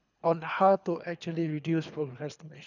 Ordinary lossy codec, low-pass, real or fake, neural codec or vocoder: none; 7.2 kHz; fake; codec, 24 kHz, 3 kbps, HILCodec